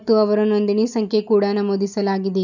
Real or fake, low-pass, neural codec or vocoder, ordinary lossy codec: real; 7.2 kHz; none; none